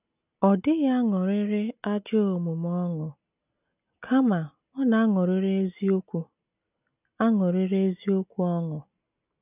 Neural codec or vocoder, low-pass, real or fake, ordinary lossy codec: none; 3.6 kHz; real; none